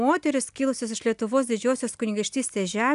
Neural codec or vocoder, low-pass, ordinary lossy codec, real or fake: none; 10.8 kHz; AAC, 96 kbps; real